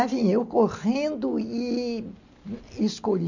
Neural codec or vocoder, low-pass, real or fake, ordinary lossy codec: none; 7.2 kHz; real; none